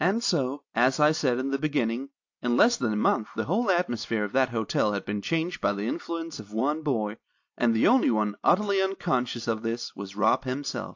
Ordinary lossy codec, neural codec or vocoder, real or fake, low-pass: AAC, 48 kbps; none; real; 7.2 kHz